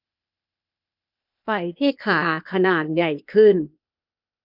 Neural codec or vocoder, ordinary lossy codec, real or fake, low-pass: codec, 16 kHz, 0.8 kbps, ZipCodec; none; fake; 5.4 kHz